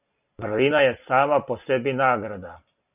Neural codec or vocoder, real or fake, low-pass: none; real; 3.6 kHz